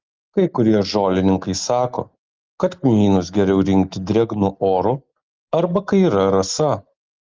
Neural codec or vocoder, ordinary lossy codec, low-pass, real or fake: none; Opus, 32 kbps; 7.2 kHz; real